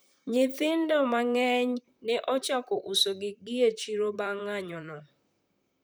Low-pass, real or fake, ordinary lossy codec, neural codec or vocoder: none; fake; none; vocoder, 44.1 kHz, 128 mel bands, Pupu-Vocoder